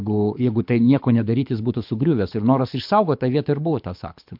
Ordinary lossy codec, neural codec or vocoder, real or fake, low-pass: MP3, 48 kbps; codec, 24 kHz, 6 kbps, HILCodec; fake; 5.4 kHz